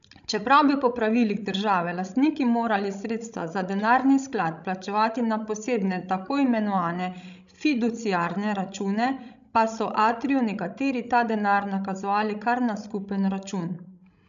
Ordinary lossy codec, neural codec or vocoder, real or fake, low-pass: none; codec, 16 kHz, 16 kbps, FreqCodec, larger model; fake; 7.2 kHz